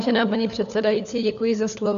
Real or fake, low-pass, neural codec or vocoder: fake; 7.2 kHz; codec, 16 kHz, 4 kbps, FunCodec, trained on LibriTTS, 50 frames a second